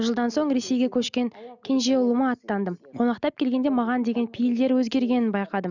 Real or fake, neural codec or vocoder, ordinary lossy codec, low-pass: real; none; none; 7.2 kHz